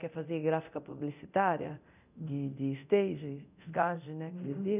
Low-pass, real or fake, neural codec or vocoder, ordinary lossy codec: 3.6 kHz; fake; codec, 24 kHz, 0.9 kbps, DualCodec; none